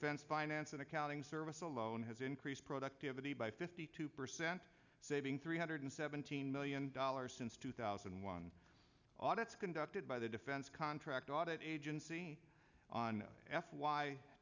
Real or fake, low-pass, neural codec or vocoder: real; 7.2 kHz; none